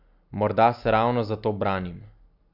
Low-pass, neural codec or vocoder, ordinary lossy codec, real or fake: 5.4 kHz; none; none; real